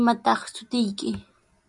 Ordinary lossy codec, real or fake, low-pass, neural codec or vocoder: MP3, 96 kbps; real; 10.8 kHz; none